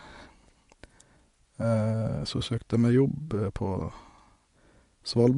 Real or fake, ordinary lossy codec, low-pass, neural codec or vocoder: fake; MP3, 64 kbps; 10.8 kHz; vocoder, 24 kHz, 100 mel bands, Vocos